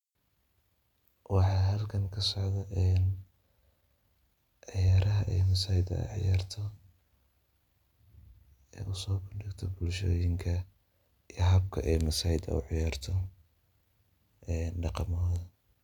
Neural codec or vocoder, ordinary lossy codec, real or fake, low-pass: none; none; real; 19.8 kHz